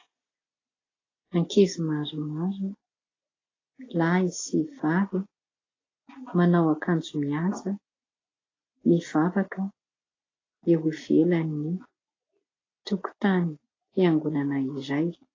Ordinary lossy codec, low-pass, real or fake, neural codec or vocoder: AAC, 32 kbps; 7.2 kHz; real; none